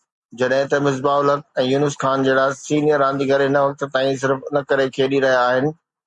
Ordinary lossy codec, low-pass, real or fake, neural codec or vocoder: Opus, 64 kbps; 10.8 kHz; real; none